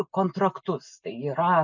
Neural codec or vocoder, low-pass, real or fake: none; 7.2 kHz; real